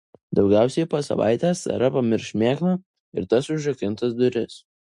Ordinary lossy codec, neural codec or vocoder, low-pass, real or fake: MP3, 48 kbps; none; 10.8 kHz; real